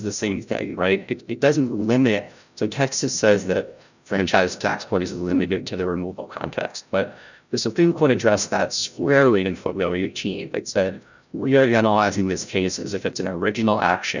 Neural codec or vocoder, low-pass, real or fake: codec, 16 kHz, 0.5 kbps, FreqCodec, larger model; 7.2 kHz; fake